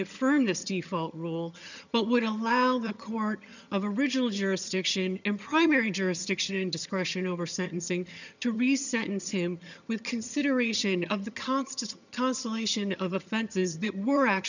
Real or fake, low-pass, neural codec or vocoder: fake; 7.2 kHz; vocoder, 22.05 kHz, 80 mel bands, HiFi-GAN